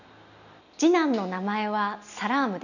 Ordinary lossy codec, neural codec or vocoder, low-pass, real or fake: none; none; 7.2 kHz; real